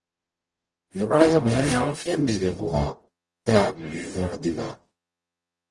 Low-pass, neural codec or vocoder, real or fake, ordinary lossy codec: 10.8 kHz; codec, 44.1 kHz, 0.9 kbps, DAC; fake; Opus, 24 kbps